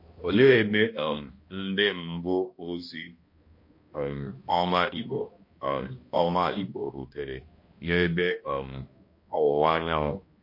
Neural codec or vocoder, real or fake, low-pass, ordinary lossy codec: codec, 16 kHz, 1 kbps, X-Codec, HuBERT features, trained on balanced general audio; fake; 5.4 kHz; MP3, 32 kbps